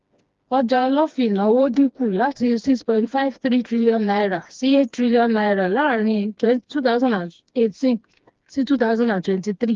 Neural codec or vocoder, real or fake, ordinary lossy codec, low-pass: codec, 16 kHz, 2 kbps, FreqCodec, smaller model; fake; Opus, 32 kbps; 7.2 kHz